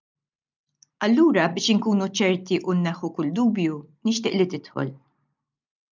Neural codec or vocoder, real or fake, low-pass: none; real; 7.2 kHz